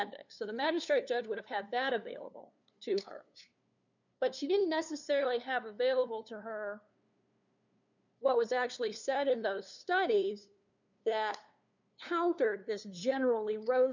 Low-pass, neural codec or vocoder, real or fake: 7.2 kHz; codec, 16 kHz, 2 kbps, FunCodec, trained on LibriTTS, 25 frames a second; fake